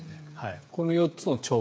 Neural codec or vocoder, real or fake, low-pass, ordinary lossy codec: codec, 16 kHz, 8 kbps, FreqCodec, smaller model; fake; none; none